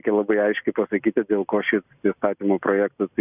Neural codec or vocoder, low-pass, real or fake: none; 3.6 kHz; real